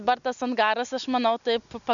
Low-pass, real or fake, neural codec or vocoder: 7.2 kHz; real; none